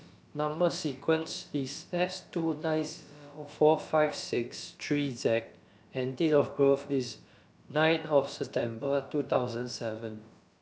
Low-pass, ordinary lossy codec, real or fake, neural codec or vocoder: none; none; fake; codec, 16 kHz, about 1 kbps, DyCAST, with the encoder's durations